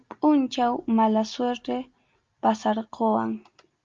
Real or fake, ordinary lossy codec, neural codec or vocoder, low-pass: real; Opus, 32 kbps; none; 7.2 kHz